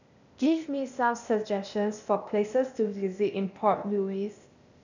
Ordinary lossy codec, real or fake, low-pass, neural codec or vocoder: none; fake; 7.2 kHz; codec, 16 kHz, 0.8 kbps, ZipCodec